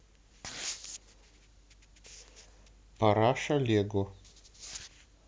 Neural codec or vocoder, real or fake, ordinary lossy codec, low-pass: none; real; none; none